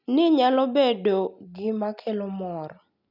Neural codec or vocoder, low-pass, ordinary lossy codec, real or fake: none; 5.4 kHz; none; real